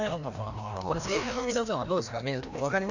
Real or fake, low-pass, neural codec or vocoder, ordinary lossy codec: fake; 7.2 kHz; codec, 16 kHz, 1 kbps, FreqCodec, larger model; none